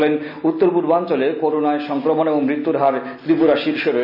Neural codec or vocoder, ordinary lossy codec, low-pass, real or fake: none; AAC, 48 kbps; 5.4 kHz; real